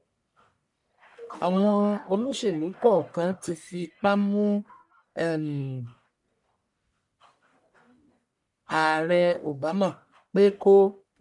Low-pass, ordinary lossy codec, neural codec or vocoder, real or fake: 10.8 kHz; MP3, 96 kbps; codec, 44.1 kHz, 1.7 kbps, Pupu-Codec; fake